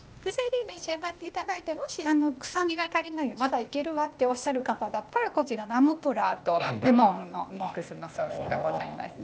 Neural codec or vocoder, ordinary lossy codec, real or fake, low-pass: codec, 16 kHz, 0.8 kbps, ZipCodec; none; fake; none